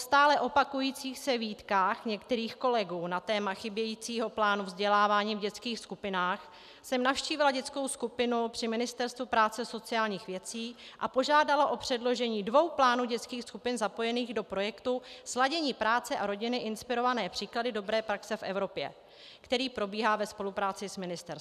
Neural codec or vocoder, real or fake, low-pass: none; real; 14.4 kHz